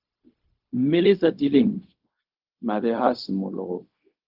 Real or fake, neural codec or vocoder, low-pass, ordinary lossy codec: fake; codec, 16 kHz, 0.4 kbps, LongCat-Audio-Codec; 5.4 kHz; Opus, 24 kbps